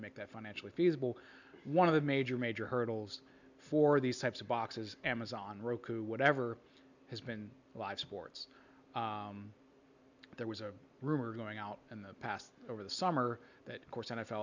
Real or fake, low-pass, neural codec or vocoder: real; 7.2 kHz; none